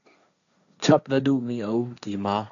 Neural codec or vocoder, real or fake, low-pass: codec, 16 kHz, 1.1 kbps, Voila-Tokenizer; fake; 7.2 kHz